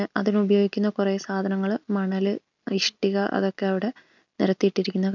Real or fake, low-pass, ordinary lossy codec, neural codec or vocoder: real; 7.2 kHz; none; none